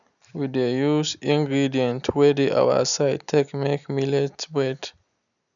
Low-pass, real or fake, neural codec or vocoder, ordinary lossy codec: 7.2 kHz; real; none; none